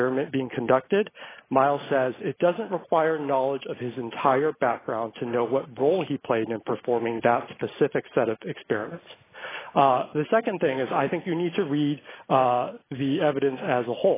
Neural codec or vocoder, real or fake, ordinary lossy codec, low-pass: none; real; AAC, 16 kbps; 3.6 kHz